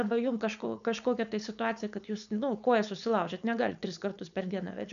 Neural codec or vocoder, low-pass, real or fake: codec, 16 kHz, 4.8 kbps, FACodec; 7.2 kHz; fake